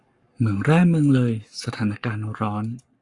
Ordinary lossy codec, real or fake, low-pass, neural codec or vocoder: Opus, 64 kbps; fake; 10.8 kHz; codec, 44.1 kHz, 7.8 kbps, Pupu-Codec